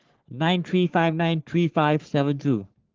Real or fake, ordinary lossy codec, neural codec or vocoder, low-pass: fake; Opus, 16 kbps; codec, 44.1 kHz, 3.4 kbps, Pupu-Codec; 7.2 kHz